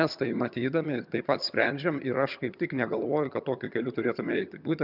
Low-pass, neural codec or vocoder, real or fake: 5.4 kHz; vocoder, 22.05 kHz, 80 mel bands, HiFi-GAN; fake